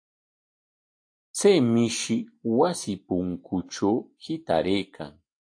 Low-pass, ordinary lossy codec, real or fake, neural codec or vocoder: 9.9 kHz; AAC, 48 kbps; real; none